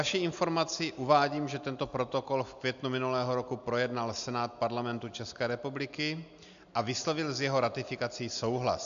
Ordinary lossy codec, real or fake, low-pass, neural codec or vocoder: AAC, 96 kbps; real; 7.2 kHz; none